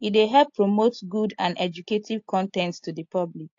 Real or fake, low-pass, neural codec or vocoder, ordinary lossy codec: real; 7.2 kHz; none; AAC, 48 kbps